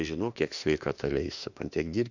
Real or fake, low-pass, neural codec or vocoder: fake; 7.2 kHz; autoencoder, 48 kHz, 32 numbers a frame, DAC-VAE, trained on Japanese speech